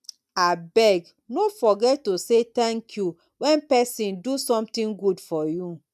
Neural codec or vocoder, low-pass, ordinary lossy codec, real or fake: none; 14.4 kHz; none; real